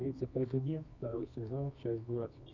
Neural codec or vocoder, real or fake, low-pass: codec, 24 kHz, 0.9 kbps, WavTokenizer, medium music audio release; fake; 7.2 kHz